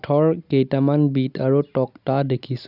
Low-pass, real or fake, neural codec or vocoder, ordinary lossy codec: 5.4 kHz; real; none; none